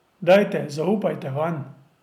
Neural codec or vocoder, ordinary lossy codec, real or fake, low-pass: none; none; real; 19.8 kHz